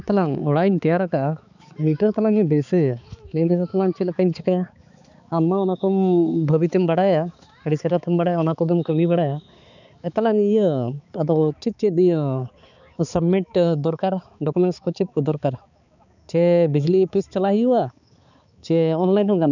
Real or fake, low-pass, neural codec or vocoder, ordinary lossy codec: fake; 7.2 kHz; codec, 16 kHz, 4 kbps, X-Codec, HuBERT features, trained on balanced general audio; none